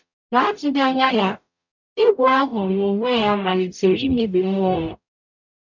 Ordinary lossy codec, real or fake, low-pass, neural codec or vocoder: none; fake; 7.2 kHz; codec, 44.1 kHz, 0.9 kbps, DAC